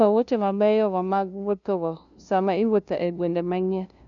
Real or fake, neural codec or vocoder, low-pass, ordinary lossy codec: fake; codec, 16 kHz, 0.5 kbps, FunCodec, trained on LibriTTS, 25 frames a second; 7.2 kHz; none